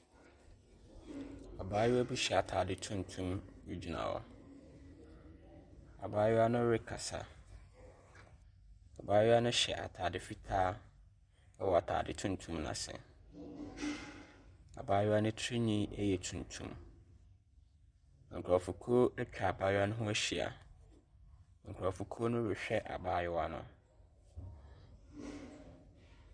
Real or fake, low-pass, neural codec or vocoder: real; 9.9 kHz; none